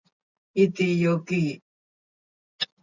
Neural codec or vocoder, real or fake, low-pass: none; real; 7.2 kHz